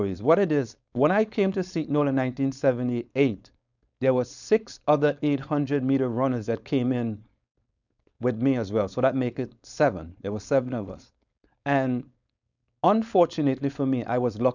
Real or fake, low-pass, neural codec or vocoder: fake; 7.2 kHz; codec, 16 kHz, 4.8 kbps, FACodec